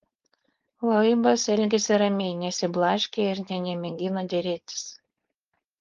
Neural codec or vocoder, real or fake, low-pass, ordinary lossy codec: codec, 16 kHz, 4.8 kbps, FACodec; fake; 7.2 kHz; Opus, 32 kbps